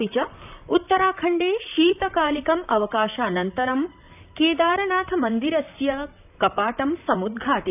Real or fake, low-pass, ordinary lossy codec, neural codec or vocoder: fake; 3.6 kHz; none; vocoder, 44.1 kHz, 128 mel bands, Pupu-Vocoder